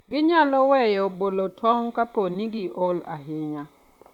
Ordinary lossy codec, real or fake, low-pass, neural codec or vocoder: none; fake; 19.8 kHz; vocoder, 44.1 kHz, 128 mel bands, Pupu-Vocoder